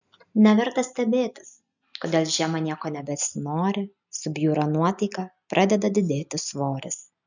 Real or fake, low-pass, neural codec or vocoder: real; 7.2 kHz; none